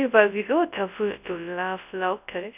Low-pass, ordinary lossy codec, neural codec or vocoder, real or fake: 3.6 kHz; none; codec, 24 kHz, 0.9 kbps, WavTokenizer, large speech release; fake